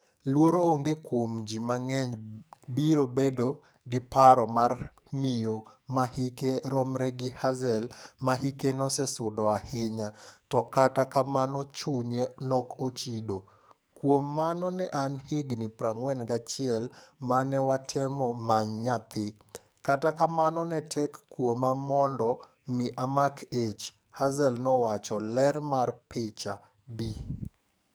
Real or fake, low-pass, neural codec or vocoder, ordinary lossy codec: fake; none; codec, 44.1 kHz, 2.6 kbps, SNAC; none